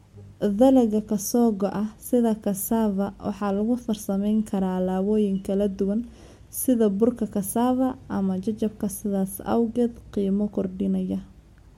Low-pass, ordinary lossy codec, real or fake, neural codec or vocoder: 19.8 kHz; MP3, 64 kbps; real; none